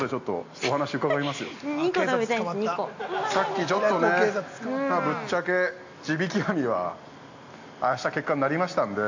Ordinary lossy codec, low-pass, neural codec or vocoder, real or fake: AAC, 48 kbps; 7.2 kHz; none; real